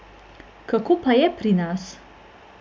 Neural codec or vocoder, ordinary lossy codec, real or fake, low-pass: none; none; real; none